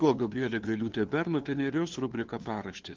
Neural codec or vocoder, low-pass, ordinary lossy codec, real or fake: codec, 16 kHz, 2 kbps, FunCodec, trained on LibriTTS, 25 frames a second; 7.2 kHz; Opus, 16 kbps; fake